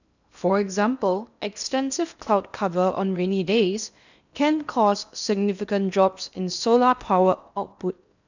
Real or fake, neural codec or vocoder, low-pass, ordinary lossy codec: fake; codec, 16 kHz in and 24 kHz out, 0.8 kbps, FocalCodec, streaming, 65536 codes; 7.2 kHz; none